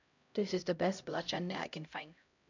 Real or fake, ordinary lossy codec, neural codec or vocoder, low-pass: fake; none; codec, 16 kHz, 0.5 kbps, X-Codec, HuBERT features, trained on LibriSpeech; 7.2 kHz